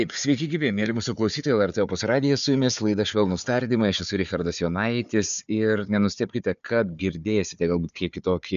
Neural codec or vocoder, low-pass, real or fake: codec, 16 kHz, 4 kbps, FunCodec, trained on Chinese and English, 50 frames a second; 7.2 kHz; fake